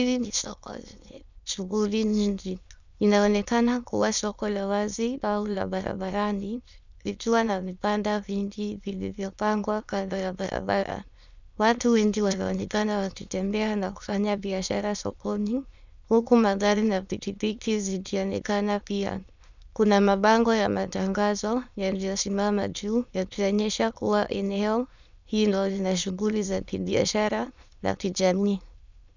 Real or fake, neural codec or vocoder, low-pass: fake; autoencoder, 22.05 kHz, a latent of 192 numbers a frame, VITS, trained on many speakers; 7.2 kHz